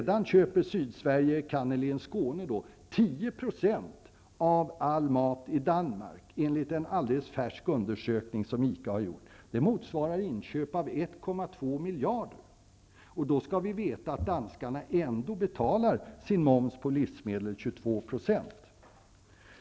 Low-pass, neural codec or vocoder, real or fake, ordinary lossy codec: none; none; real; none